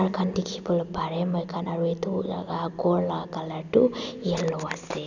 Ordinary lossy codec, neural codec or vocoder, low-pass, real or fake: none; none; 7.2 kHz; real